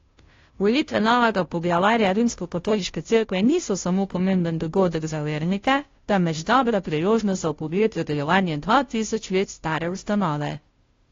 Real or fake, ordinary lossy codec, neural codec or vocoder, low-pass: fake; AAC, 32 kbps; codec, 16 kHz, 0.5 kbps, FunCodec, trained on Chinese and English, 25 frames a second; 7.2 kHz